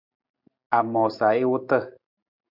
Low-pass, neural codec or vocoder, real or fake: 5.4 kHz; none; real